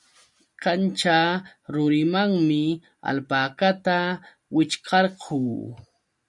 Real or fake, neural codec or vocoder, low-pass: real; none; 10.8 kHz